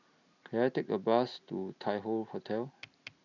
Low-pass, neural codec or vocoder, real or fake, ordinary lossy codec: 7.2 kHz; none; real; none